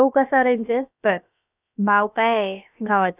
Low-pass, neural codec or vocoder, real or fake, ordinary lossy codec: 3.6 kHz; codec, 16 kHz, about 1 kbps, DyCAST, with the encoder's durations; fake; none